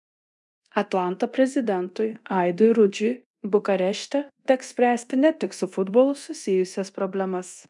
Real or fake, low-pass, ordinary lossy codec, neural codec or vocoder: fake; 10.8 kHz; MP3, 96 kbps; codec, 24 kHz, 0.9 kbps, DualCodec